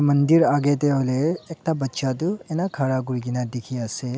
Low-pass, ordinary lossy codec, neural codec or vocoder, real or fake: none; none; none; real